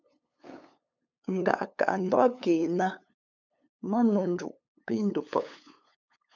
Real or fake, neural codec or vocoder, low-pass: fake; codec, 16 kHz, 8 kbps, FunCodec, trained on LibriTTS, 25 frames a second; 7.2 kHz